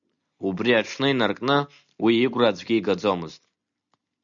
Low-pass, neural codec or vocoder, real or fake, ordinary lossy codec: 7.2 kHz; none; real; MP3, 96 kbps